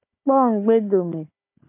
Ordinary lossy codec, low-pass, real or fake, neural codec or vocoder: MP3, 24 kbps; 3.6 kHz; fake; codec, 16 kHz, 4 kbps, FunCodec, trained on Chinese and English, 50 frames a second